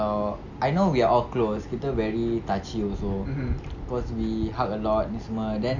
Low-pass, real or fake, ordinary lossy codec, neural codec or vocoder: 7.2 kHz; real; none; none